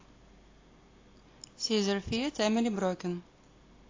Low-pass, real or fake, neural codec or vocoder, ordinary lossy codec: 7.2 kHz; real; none; AAC, 32 kbps